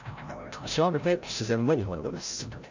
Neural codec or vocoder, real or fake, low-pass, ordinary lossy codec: codec, 16 kHz, 0.5 kbps, FreqCodec, larger model; fake; 7.2 kHz; AAC, 48 kbps